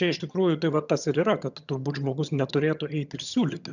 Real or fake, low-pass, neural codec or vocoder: fake; 7.2 kHz; vocoder, 22.05 kHz, 80 mel bands, HiFi-GAN